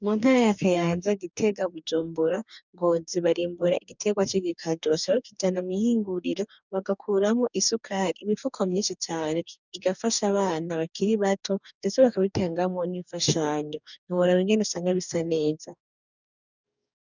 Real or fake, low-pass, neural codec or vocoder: fake; 7.2 kHz; codec, 44.1 kHz, 2.6 kbps, DAC